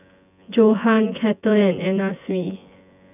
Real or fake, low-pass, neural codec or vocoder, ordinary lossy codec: fake; 3.6 kHz; vocoder, 24 kHz, 100 mel bands, Vocos; none